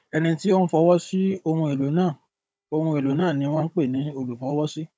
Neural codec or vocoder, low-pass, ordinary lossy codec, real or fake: codec, 16 kHz, 16 kbps, FunCodec, trained on Chinese and English, 50 frames a second; none; none; fake